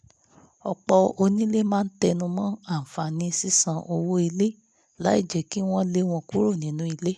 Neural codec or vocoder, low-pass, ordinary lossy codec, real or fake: none; none; none; real